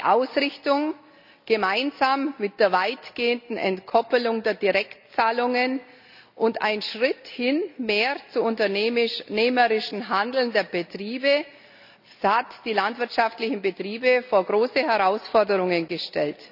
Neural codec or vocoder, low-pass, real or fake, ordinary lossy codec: none; 5.4 kHz; real; none